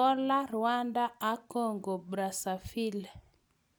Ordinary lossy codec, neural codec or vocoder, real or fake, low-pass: none; none; real; none